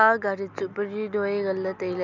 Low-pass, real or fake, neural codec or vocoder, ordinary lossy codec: 7.2 kHz; real; none; none